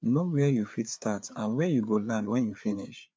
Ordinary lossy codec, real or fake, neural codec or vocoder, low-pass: none; fake; codec, 16 kHz, 4 kbps, FreqCodec, larger model; none